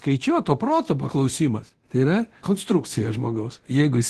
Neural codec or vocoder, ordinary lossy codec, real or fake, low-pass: codec, 24 kHz, 0.9 kbps, DualCodec; Opus, 16 kbps; fake; 10.8 kHz